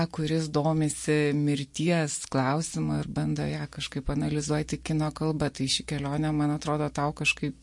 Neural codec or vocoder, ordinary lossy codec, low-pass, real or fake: none; MP3, 48 kbps; 10.8 kHz; real